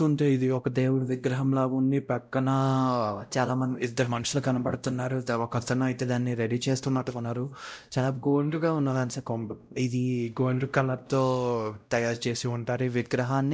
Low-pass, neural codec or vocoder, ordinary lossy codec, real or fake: none; codec, 16 kHz, 0.5 kbps, X-Codec, WavLM features, trained on Multilingual LibriSpeech; none; fake